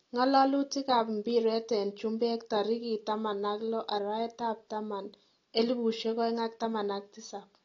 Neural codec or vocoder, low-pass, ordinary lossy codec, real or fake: none; 7.2 kHz; AAC, 32 kbps; real